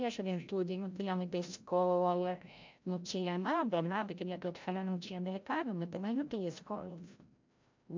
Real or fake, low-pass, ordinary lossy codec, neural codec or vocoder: fake; 7.2 kHz; MP3, 64 kbps; codec, 16 kHz, 0.5 kbps, FreqCodec, larger model